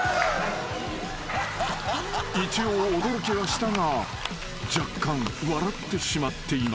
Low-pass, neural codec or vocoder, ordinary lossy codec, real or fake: none; none; none; real